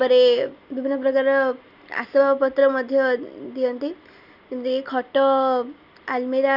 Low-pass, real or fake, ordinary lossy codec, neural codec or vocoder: 5.4 kHz; real; none; none